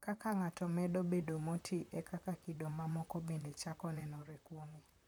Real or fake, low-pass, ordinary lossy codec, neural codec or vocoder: fake; none; none; vocoder, 44.1 kHz, 128 mel bands, Pupu-Vocoder